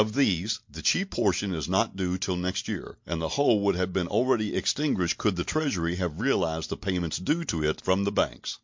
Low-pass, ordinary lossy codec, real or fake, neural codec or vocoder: 7.2 kHz; MP3, 48 kbps; real; none